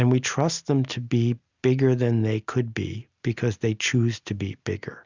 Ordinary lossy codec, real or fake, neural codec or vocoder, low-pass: Opus, 64 kbps; real; none; 7.2 kHz